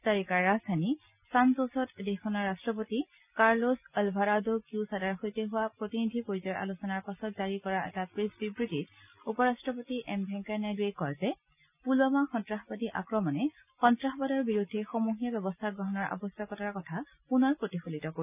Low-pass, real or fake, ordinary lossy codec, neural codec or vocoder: 3.6 kHz; real; none; none